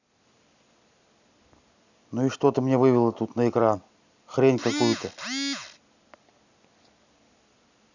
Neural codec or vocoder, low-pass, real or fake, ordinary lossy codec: none; 7.2 kHz; real; none